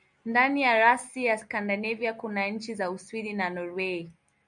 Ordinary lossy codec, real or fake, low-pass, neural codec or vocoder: MP3, 96 kbps; real; 9.9 kHz; none